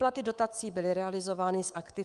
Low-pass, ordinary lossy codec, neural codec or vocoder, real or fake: 10.8 kHz; Opus, 32 kbps; codec, 24 kHz, 3.1 kbps, DualCodec; fake